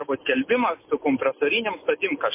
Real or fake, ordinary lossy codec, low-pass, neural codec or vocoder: real; MP3, 24 kbps; 3.6 kHz; none